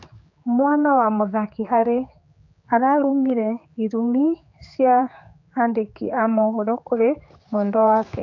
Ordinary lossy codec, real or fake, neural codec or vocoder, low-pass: AAC, 48 kbps; fake; codec, 16 kHz, 4 kbps, X-Codec, HuBERT features, trained on general audio; 7.2 kHz